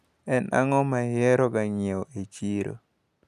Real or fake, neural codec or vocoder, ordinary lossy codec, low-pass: real; none; none; 14.4 kHz